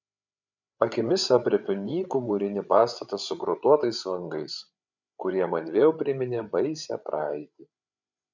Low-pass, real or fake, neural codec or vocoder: 7.2 kHz; fake; codec, 16 kHz, 8 kbps, FreqCodec, larger model